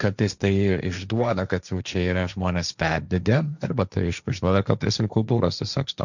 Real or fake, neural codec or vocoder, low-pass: fake; codec, 16 kHz, 1.1 kbps, Voila-Tokenizer; 7.2 kHz